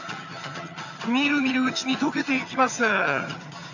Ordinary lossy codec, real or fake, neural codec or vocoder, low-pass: none; fake; vocoder, 22.05 kHz, 80 mel bands, HiFi-GAN; 7.2 kHz